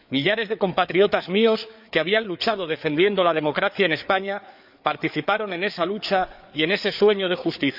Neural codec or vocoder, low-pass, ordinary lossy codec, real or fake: codec, 16 kHz, 4 kbps, FreqCodec, larger model; 5.4 kHz; none; fake